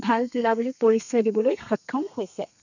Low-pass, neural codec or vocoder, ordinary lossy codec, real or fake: 7.2 kHz; codec, 32 kHz, 1.9 kbps, SNAC; none; fake